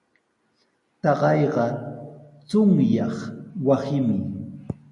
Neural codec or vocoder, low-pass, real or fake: none; 10.8 kHz; real